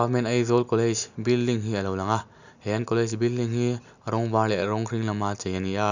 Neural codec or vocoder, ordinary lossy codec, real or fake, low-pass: none; none; real; 7.2 kHz